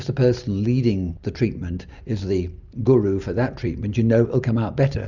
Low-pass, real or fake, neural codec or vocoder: 7.2 kHz; real; none